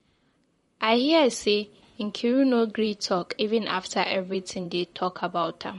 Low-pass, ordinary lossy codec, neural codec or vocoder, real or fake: 19.8 kHz; MP3, 48 kbps; vocoder, 44.1 kHz, 128 mel bands, Pupu-Vocoder; fake